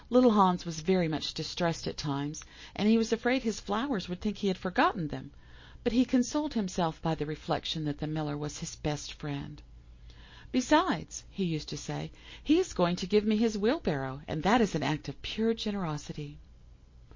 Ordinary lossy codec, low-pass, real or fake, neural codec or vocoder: MP3, 32 kbps; 7.2 kHz; real; none